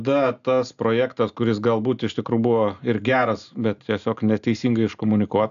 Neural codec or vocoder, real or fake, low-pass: none; real; 7.2 kHz